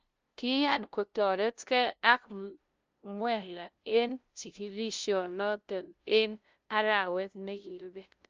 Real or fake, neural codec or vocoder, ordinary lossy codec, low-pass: fake; codec, 16 kHz, 0.5 kbps, FunCodec, trained on LibriTTS, 25 frames a second; Opus, 24 kbps; 7.2 kHz